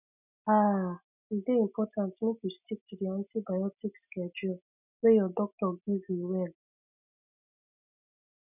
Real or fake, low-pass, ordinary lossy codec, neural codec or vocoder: real; 3.6 kHz; none; none